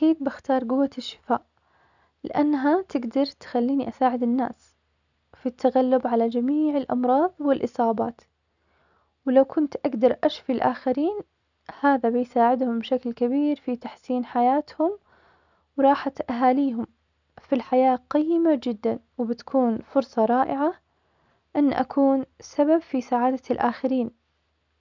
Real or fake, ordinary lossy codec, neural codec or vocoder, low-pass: real; none; none; 7.2 kHz